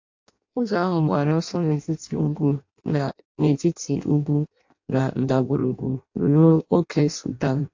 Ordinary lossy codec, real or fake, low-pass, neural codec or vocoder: AAC, 48 kbps; fake; 7.2 kHz; codec, 16 kHz in and 24 kHz out, 0.6 kbps, FireRedTTS-2 codec